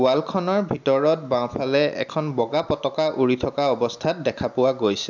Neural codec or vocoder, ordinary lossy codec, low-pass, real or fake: none; none; 7.2 kHz; real